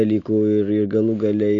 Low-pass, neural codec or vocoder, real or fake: 7.2 kHz; none; real